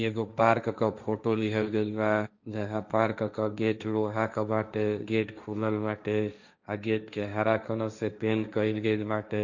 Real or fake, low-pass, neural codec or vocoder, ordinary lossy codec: fake; 7.2 kHz; codec, 16 kHz, 1.1 kbps, Voila-Tokenizer; none